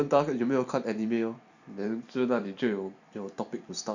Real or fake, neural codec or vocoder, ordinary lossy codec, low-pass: fake; vocoder, 44.1 kHz, 128 mel bands every 256 samples, BigVGAN v2; none; 7.2 kHz